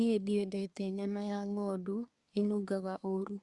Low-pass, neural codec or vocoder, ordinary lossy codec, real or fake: 10.8 kHz; codec, 24 kHz, 1 kbps, SNAC; Opus, 64 kbps; fake